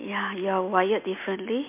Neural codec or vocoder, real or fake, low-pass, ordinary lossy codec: none; real; 3.6 kHz; MP3, 24 kbps